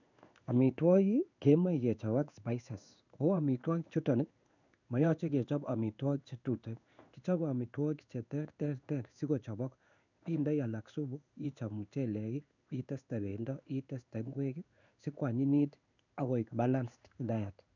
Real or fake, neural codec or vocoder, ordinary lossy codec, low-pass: fake; codec, 16 kHz in and 24 kHz out, 1 kbps, XY-Tokenizer; none; 7.2 kHz